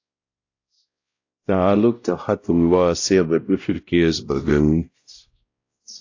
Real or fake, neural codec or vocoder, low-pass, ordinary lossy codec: fake; codec, 16 kHz, 0.5 kbps, X-Codec, WavLM features, trained on Multilingual LibriSpeech; 7.2 kHz; AAC, 48 kbps